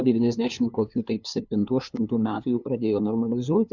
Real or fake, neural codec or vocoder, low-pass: fake; codec, 16 kHz, 2 kbps, FunCodec, trained on LibriTTS, 25 frames a second; 7.2 kHz